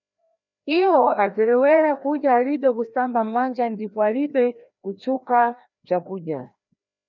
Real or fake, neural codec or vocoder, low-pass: fake; codec, 16 kHz, 1 kbps, FreqCodec, larger model; 7.2 kHz